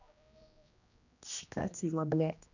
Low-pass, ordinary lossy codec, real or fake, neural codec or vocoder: 7.2 kHz; none; fake; codec, 16 kHz, 1 kbps, X-Codec, HuBERT features, trained on general audio